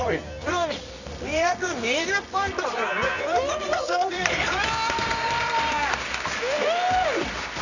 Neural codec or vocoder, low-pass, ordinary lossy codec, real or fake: codec, 24 kHz, 0.9 kbps, WavTokenizer, medium music audio release; 7.2 kHz; none; fake